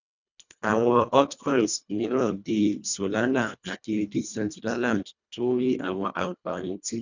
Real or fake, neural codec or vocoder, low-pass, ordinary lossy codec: fake; codec, 24 kHz, 1.5 kbps, HILCodec; 7.2 kHz; none